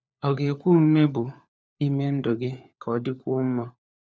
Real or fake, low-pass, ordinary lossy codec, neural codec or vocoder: fake; none; none; codec, 16 kHz, 4 kbps, FunCodec, trained on LibriTTS, 50 frames a second